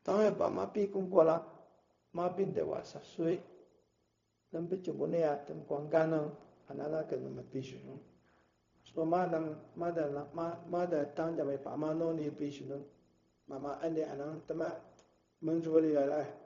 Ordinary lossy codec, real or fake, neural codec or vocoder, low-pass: AAC, 48 kbps; fake; codec, 16 kHz, 0.4 kbps, LongCat-Audio-Codec; 7.2 kHz